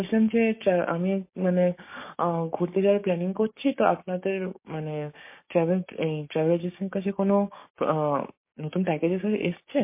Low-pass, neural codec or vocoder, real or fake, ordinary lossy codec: 3.6 kHz; codec, 16 kHz, 6 kbps, DAC; fake; MP3, 24 kbps